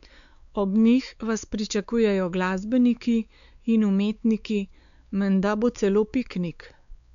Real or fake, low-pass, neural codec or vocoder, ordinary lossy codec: fake; 7.2 kHz; codec, 16 kHz, 4 kbps, X-Codec, WavLM features, trained on Multilingual LibriSpeech; none